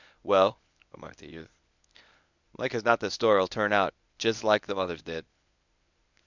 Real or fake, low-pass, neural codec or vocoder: fake; 7.2 kHz; codec, 24 kHz, 0.9 kbps, WavTokenizer, medium speech release version 1